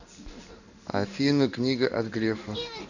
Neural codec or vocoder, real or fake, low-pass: codec, 16 kHz in and 24 kHz out, 1 kbps, XY-Tokenizer; fake; 7.2 kHz